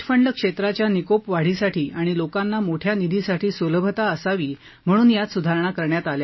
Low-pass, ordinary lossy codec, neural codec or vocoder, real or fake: 7.2 kHz; MP3, 24 kbps; none; real